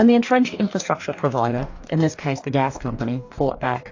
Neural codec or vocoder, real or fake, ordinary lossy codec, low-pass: codec, 44.1 kHz, 2.6 kbps, DAC; fake; AAC, 48 kbps; 7.2 kHz